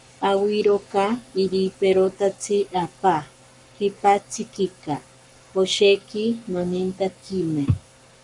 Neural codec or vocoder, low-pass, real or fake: codec, 44.1 kHz, 7.8 kbps, Pupu-Codec; 10.8 kHz; fake